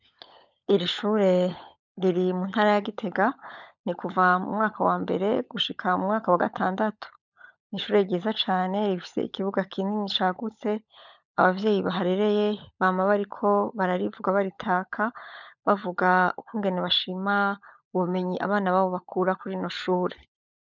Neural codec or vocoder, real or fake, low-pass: codec, 16 kHz, 16 kbps, FunCodec, trained on LibriTTS, 50 frames a second; fake; 7.2 kHz